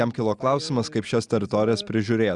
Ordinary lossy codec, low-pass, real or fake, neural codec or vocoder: Opus, 64 kbps; 10.8 kHz; real; none